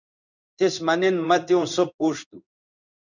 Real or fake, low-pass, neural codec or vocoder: fake; 7.2 kHz; codec, 16 kHz in and 24 kHz out, 1 kbps, XY-Tokenizer